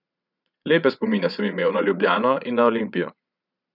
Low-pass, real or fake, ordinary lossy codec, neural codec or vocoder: 5.4 kHz; fake; none; vocoder, 22.05 kHz, 80 mel bands, Vocos